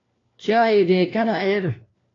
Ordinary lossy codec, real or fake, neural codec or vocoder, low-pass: AAC, 32 kbps; fake; codec, 16 kHz, 1 kbps, FunCodec, trained on LibriTTS, 50 frames a second; 7.2 kHz